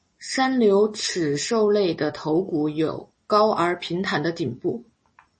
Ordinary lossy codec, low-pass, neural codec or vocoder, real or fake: MP3, 32 kbps; 10.8 kHz; none; real